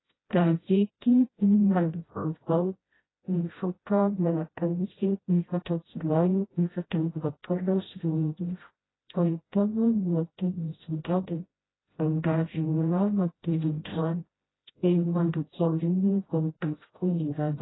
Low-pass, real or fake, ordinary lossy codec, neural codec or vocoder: 7.2 kHz; fake; AAC, 16 kbps; codec, 16 kHz, 0.5 kbps, FreqCodec, smaller model